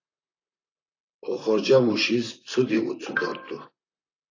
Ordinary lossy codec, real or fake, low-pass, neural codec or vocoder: AAC, 32 kbps; fake; 7.2 kHz; vocoder, 44.1 kHz, 128 mel bands, Pupu-Vocoder